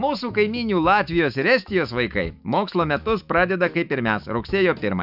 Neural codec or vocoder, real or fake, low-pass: autoencoder, 48 kHz, 128 numbers a frame, DAC-VAE, trained on Japanese speech; fake; 5.4 kHz